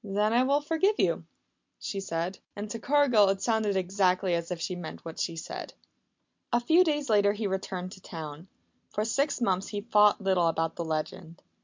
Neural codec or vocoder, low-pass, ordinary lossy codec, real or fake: none; 7.2 kHz; MP3, 64 kbps; real